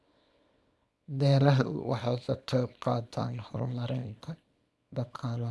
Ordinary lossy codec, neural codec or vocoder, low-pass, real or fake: none; codec, 24 kHz, 0.9 kbps, WavTokenizer, small release; none; fake